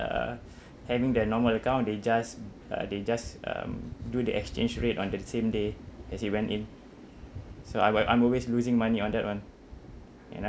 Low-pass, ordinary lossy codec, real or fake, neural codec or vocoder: none; none; real; none